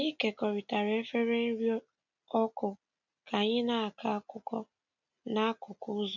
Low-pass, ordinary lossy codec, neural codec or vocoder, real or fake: 7.2 kHz; none; none; real